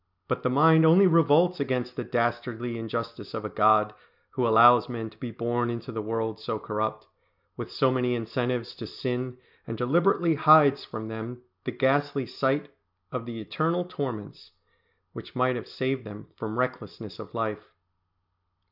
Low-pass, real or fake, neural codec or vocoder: 5.4 kHz; real; none